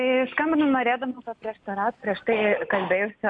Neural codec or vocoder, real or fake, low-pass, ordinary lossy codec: none; real; 9.9 kHz; MP3, 96 kbps